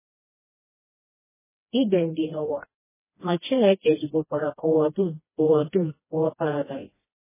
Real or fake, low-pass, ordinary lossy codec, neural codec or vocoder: fake; 3.6 kHz; MP3, 16 kbps; codec, 16 kHz, 1 kbps, FreqCodec, smaller model